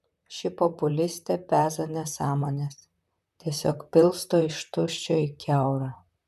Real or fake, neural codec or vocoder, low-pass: fake; vocoder, 44.1 kHz, 128 mel bands, Pupu-Vocoder; 14.4 kHz